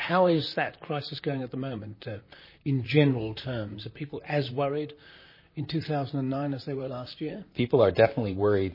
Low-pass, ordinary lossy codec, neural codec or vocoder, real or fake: 5.4 kHz; MP3, 24 kbps; vocoder, 44.1 kHz, 128 mel bands, Pupu-Vocoder; fake